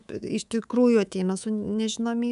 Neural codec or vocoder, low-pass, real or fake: codec, 24 kHz, 3.1 kbps, DualCodec; 10.8 kHz; fake